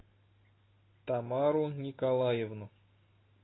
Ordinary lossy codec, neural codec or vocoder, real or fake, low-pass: AAC, 16 kbps; none; real; 7.2 kHz